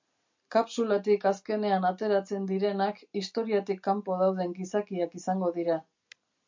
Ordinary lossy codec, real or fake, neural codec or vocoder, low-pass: MP3, 64 kbps; real; none; 7.2 kHz